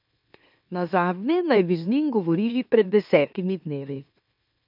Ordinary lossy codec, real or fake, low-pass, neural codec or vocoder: none; fake; 5.4 kHz; autoencoder, 44.1 kHz, a latent of 192 numbers a frame, MeloTTS